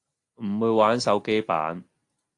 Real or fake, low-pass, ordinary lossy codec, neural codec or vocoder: real; 10.8 kHz; AAC, 48 kbps; none